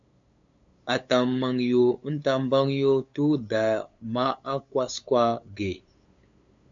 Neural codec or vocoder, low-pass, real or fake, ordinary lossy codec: codec, 16 kHz, 8 kbps, FunCodec, trained on LibriTTS, 25 frames a second; 7.2 kHz; fake; MP3, 64 kbps